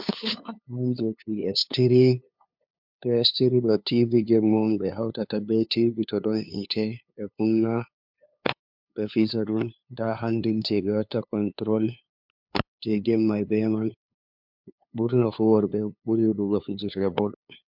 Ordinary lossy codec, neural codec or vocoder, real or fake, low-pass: MP3, 48 kbps; codec, 16 kHz, 2 kbps, FunCodec, trained on LibriTTS, 25 frames a second; fake; 5.4 kHz